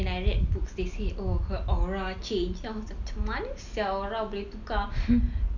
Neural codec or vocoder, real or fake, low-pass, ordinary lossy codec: none; real; 7.2 kHz; none